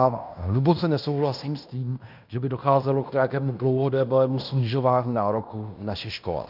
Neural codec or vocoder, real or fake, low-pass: codec, 16 kHz in and 24 kHz out, 0.9 kbps, LongCat-Audio-Codec, fine tuned four codebook decoder; fake; 5.4 kHz